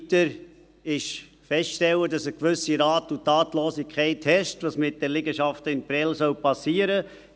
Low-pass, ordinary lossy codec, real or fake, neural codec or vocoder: none; none; real; none